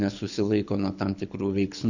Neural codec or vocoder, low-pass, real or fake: codec, 24 kHz, 6 kbps, HILCodec; 7.2 kHz; fake